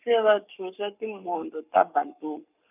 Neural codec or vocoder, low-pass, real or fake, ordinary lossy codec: codec, 44.1 kHz, 7.8 kbps, Pupu-Codec; 3.6 kHz; fake; none